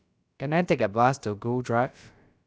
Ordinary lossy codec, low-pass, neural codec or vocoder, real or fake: none; none; codec, 16 kHz, about 1 kbps, DyCAST, with the encoder's durations; fake